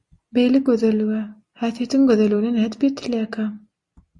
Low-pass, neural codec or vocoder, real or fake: 10.8 kHz; none; real